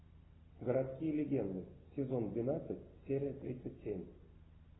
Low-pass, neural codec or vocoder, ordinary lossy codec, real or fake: 7.2 kHz; none; AAC, 16 kbps; real